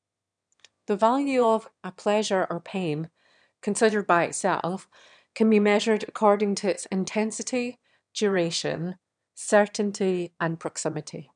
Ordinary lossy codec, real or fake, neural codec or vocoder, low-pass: none; fake; autoencoder, 22.05 kHz, a latent of 192 numbers a frame, VITS, trained on one speaker; 9.9 kHz